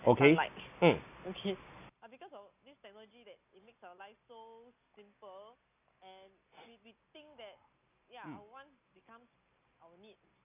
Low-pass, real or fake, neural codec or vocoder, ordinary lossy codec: 3.6 kHz; real; none; none